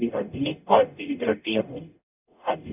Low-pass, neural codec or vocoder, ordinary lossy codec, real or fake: 3.6 kHz; codec, 44.1 kHz, 0.9 kbps, DAC; none; fake